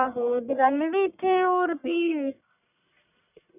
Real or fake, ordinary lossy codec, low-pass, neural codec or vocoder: fake; none; 3.6 kHz; codec, 44.1 kHz, 1.7 kbps, Pupu-Codec